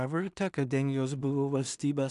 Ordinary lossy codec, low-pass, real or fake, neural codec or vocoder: MP3, 96 kbps; 10.8 kHz; fake; codec, 16 kHz in and 24 kHz out, 0.4 kbps, LongCat-Audio-Codec, two codebook decoder